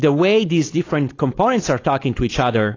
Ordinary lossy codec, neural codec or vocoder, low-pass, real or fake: AAC, 32 kbps; codec, 16 kHz, 4.8 kbps, FACodec; 7.2 kHz; fake